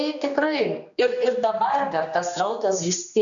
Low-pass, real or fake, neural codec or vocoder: 7.2 kHz; fake; codec, 16 kHz, 2 kbps, X-Codec, HuBERT features, trained on general audio